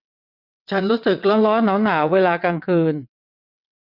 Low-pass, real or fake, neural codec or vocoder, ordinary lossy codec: 5.4 kHz; fake; vocoder, 22.05 kHz, 80 mel bands, WaveNeXt; none